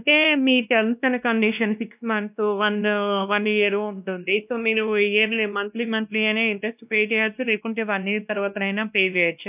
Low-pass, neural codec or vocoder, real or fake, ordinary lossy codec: 3.6 kHz; codec, 16 kHz, 1 kbps, X-Codec, WavLM features, trained on Multilingual LibriSpeech; fake; none